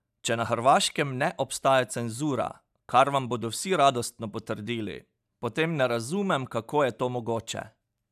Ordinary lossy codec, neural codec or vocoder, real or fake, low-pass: none; none; real; 14.4 kHz